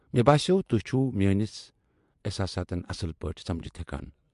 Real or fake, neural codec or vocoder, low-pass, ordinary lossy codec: fake; vocoder, 24 kHz, 100 mel bands, Vocos; 10.8 kHz; MP3, 64 kbps